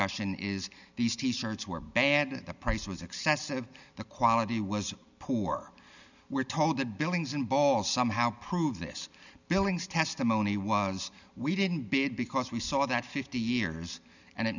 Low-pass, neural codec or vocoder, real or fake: 7.2 kHz; none; real